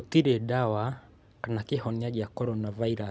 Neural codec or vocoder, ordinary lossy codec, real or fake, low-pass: none; none; real; none